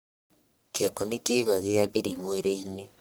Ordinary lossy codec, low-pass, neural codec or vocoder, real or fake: none; none; codec, 44.1 kHz, 1.7 kbps, Pupu-Codec; fake